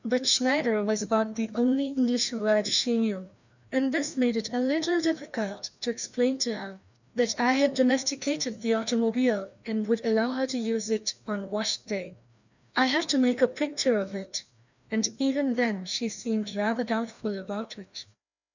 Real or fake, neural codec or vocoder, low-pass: fake; codec, 16 kHz, 1 kbps, FreqCodec, larger model; 7.2 kHz